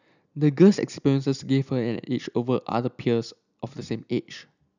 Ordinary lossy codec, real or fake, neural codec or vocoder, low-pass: none; real; none; 7.2 kHz